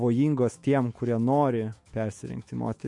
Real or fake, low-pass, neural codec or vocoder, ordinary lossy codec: fake; 10.8 kHz; autoencoder, 48 kHz, 128 numbers a frame, DAC-VAE, trained on Japanese speech; MP3, 48 kbps